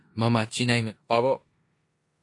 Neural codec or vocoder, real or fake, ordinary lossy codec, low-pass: codec, 16 kHz in and 24 kHz out, 0.9 kbps, LongCat-Audio-Codec, four codebook decoder; fake; AAC, 48 kbps; 10.8 kHz